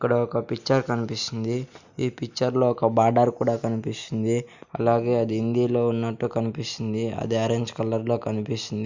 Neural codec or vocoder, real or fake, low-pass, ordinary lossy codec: none; real; 7.2 kHz; none